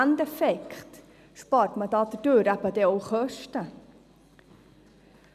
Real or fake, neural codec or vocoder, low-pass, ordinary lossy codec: real; none; 14.4 kHz; none